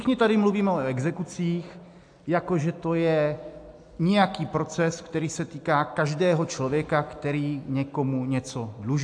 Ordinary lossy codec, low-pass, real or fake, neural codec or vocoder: AAC, 64 kbps; 9.9 kHz; real; none